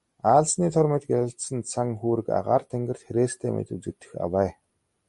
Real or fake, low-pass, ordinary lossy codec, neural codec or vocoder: real; 14.4 kHz; MP3, 48 kbps; none